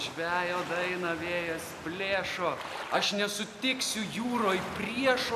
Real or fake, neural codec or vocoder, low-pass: real; none; 14.4 kHz